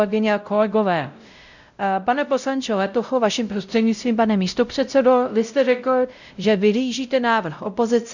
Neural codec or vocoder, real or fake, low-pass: codec, 16 kHz, 0.5 kbps, X-Codec, WavLM features, trained on Multilingual LibriSpeech; fake; 7.2 kHz